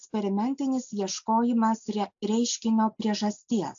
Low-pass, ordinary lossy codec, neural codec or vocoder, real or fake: 7.2 kHz; AAC, 64 kbps; none; real